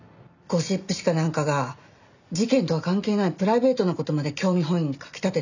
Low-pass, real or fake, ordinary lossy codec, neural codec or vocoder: 7.2 kHz; real; none; none